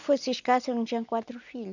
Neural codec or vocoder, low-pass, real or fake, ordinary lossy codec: none; 7.2 kHz; real; none